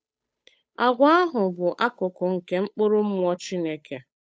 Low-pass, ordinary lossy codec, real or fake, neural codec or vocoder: none; none; fake; codec, 16 kHz, 8 kbps, FunCodec, trained on Chinese and English, 25 frames a second